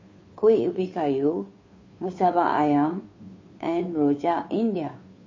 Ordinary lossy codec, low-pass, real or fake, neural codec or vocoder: MP3, 32 kbps; 7.2 kHz; fake; codec, 16 kHz, 2 kbps, FunCodec, trained on Chinese and English, 25 frames a second